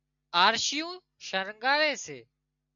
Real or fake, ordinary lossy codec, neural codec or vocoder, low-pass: real; MP3, 64 kbps; none; 7.2 kHz